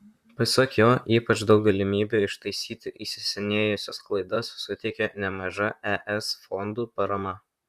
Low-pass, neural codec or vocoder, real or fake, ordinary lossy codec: 14.4 kHz; vocoder, 44.1 kHz, 128 mel bands, Pupu-Vocoder; fake; Opus, 64 kbps